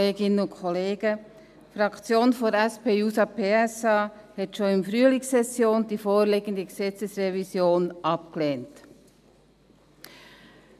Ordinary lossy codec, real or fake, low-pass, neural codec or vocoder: none; real; 14.4 kHz; none